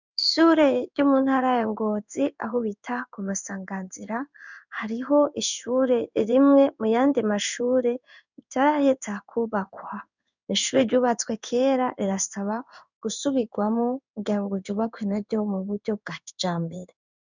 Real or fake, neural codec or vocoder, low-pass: fake; codec, 16 kHz in and 24 kHz out, 1 kbps, XY-Tokenizer; 7.2 kHz